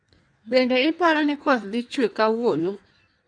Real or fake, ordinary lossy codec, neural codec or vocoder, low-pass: fake; none; codec, 16 kHz in and 24 kHz out, 1.1 kbps, FireRedTTS-2 codec; 9.9 kHz